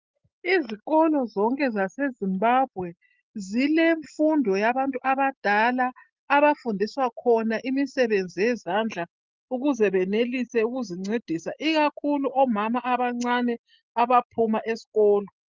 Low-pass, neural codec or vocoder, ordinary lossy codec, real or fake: 7.2 kHz; none; Opus, 32 kbps; real